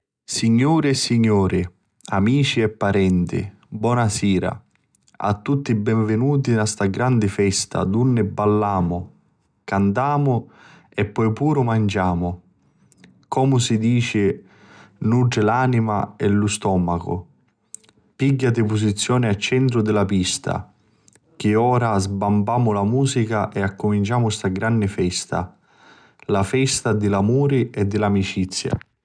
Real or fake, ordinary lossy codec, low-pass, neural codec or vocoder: real; none; 9.9 kHz; none